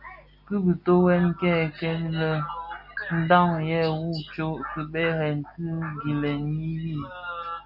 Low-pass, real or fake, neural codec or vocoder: 5.4 kHz; real; none